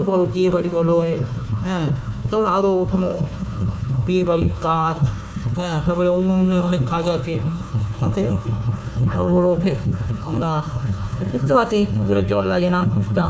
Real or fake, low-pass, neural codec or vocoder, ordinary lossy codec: fake; none; codec, 16 kHz, 1 kbps, FunCodec, trained on Chinese and English, 50 frames a second; none